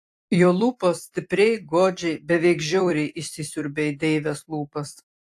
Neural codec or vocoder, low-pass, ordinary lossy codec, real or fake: vocoder, 44.1 kHz, 128 mel bands every 256 samples, BigVGAN v2; 14.4 kHz; AAC, 64 kbps; fake